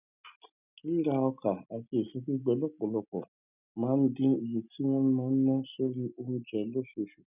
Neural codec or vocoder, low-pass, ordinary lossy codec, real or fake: none; 3.6 kHz; none; real